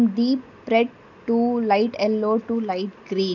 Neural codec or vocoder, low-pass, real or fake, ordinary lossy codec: none; 7.2 kHz; real; none